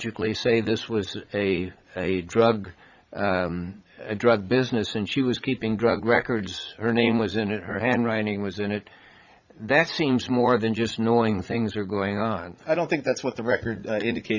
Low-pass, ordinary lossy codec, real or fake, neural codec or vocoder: 7.2 kHz; Opus, 64 kbps; fake; vocoder, 44.1 kHz, 80 mel bands, Vocos